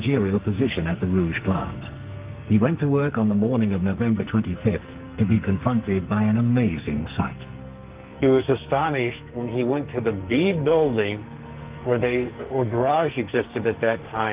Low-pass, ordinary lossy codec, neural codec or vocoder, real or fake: 3.6 kHz; Opus, 24 kbps; codec, 44.1 kHz, 2.6 kbps, SNAC; fake